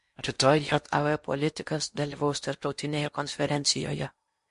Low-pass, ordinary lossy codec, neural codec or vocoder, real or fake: 10.8 kHz; MP3, 48 kbps; codec, 16 kHz in and 24 kHz out, 0.8 kbps, FocalCodec, streaming, 65536 codes; fake